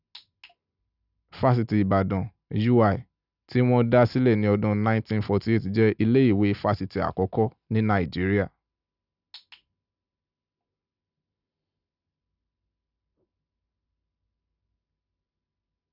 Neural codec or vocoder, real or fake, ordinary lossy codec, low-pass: none; real; none; 5.4 kHz